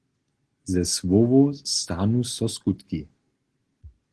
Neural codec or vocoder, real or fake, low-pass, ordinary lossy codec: none; real; 10.8 kHz; Opus, 16 kbps